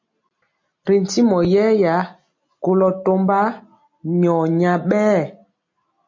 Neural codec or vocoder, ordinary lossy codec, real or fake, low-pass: none; MP3, 48 kbps; real; 7.2 kHz